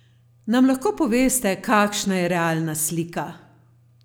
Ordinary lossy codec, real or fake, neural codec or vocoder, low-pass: none; real; none; none